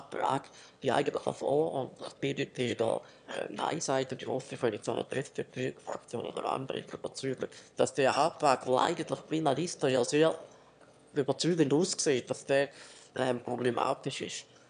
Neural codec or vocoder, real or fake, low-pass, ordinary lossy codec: autoencoder, 22.05 kHz, a latent of 192 numbers a frame, VITS, trained on one speaker; fake; 9.9 kHz; none